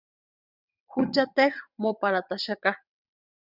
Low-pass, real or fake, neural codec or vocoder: 5.4 kHz; fake; vocoder, 22.05 kHz, 80 mel bands, WaveNeXt